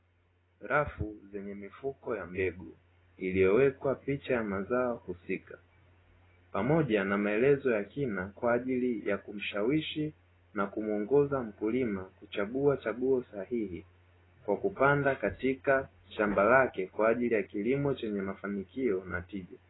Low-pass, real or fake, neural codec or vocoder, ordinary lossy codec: 7.2 kHz; real; none; AAC, 16 kbps